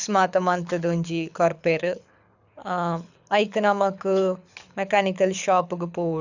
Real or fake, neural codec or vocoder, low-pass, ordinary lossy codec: fake; codec, 24 kHz, 6 kbps, HILCodec; 7.2 kHz; none